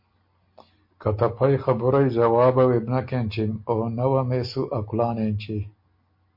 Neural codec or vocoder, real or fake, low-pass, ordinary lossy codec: none; real; 5.4 kHz; MP3, 32 kbps